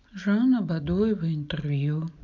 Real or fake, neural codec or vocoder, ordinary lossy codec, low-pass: real; none; MP3, 64 kbps; 7.2 kHz